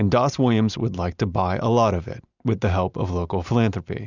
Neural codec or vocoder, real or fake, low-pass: none; real; 7.2 kHz